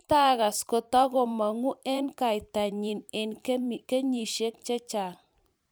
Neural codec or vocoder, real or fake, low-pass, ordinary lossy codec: vocoder, 44.1 kHz, 128 mel bands every 512 samples, BigVGAN v2; fake; none; none